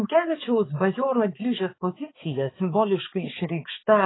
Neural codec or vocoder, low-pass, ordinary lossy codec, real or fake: codec, 16 kHz, 4 kbps, X-Codec, HuBERT features, trained on balanced general audio; 7.2 kHz; AAC, 16 kbps; fake